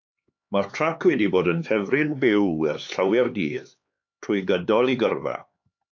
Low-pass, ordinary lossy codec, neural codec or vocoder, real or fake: 7.2 kHz; AAC, 48 kbps; codec, 16 kHz, 4 kbps, X-Codec, HuBERT features, trained on LibriSpeech; fake